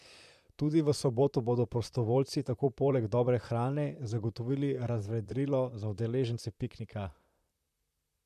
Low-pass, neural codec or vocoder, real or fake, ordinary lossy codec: 14.4 kHz; vocoder, 44.1 kHz, 128 mel bands, Pupu-Vocoder; fake; MP3, 96 kbps